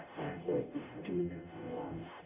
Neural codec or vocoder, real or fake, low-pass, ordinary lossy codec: codec, 44.1 kHz, 0.9 kbps, DAC; fake; 3.6 kHz; none